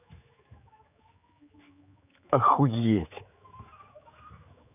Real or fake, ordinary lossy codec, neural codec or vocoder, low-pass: fake; none; codec, 16 kHz, 4 kbps, X-Codec, HuBERT features, trained on general audio; 3.6 kHz